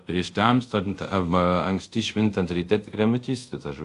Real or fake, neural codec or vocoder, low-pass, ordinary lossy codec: fake; codec, 24 kHz, 0.5 kbps, DualCodec; 10.8 kHz; AAC, 64 kbps